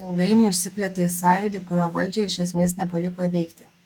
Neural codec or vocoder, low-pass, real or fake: codec, 44.1 kHz, 2.6 kbps, DAC; 19.8 kHz; fake